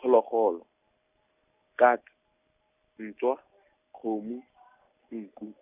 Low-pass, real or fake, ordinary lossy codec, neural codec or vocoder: 3.6 kHz; real; none; none